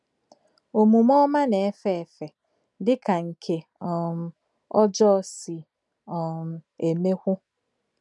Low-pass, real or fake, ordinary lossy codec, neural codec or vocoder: 10.8 kHz; real; none; none